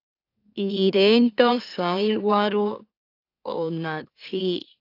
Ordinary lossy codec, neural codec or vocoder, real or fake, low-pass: AAC, 32 kbps; autoencoder, 44.1 kHz, a latent of 192 numbers a frame, MeloTTS; fake; 5.4 kHz